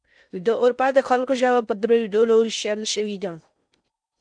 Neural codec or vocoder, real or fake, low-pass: codec, 16 kHz in and 24 kHz out, 0.6 kbps, FocalCodec, streaming, 4096 codes; fake; 9.9 kHz